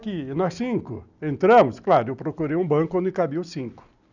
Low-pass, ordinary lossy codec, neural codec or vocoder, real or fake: 7.2 kHz; none; none; real